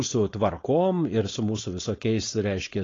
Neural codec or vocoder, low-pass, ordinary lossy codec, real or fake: codec, 16 kHz, 4.8 kbps, FACodec; 7.2 kHz; AAC, 32 kbps; fake